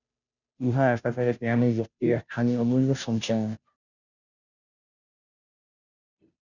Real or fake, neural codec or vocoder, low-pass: fake; codec, 16 kHz, 0.5 kbps, FunCodec, trained on Chinese and English, 25 frames a second; 7.2 kHz